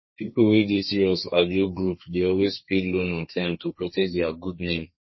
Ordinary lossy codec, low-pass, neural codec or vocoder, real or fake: MP3, 24 kbps; 7.2 kHz; codec, 32 kHz, 1.9 kbps, SNAC; fake